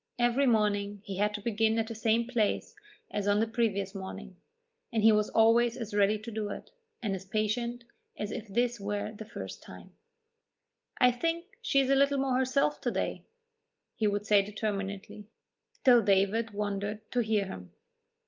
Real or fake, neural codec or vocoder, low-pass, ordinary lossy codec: real; none; 7.2 kHz; Opus, 24 kbps